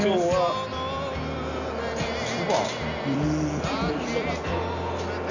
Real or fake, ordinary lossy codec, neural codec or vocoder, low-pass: real; none; none; 7.2 kHz